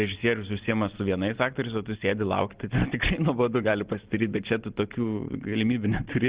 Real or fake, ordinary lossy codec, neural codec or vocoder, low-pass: real; Opus, 16 kbps; none; 3.6 kHz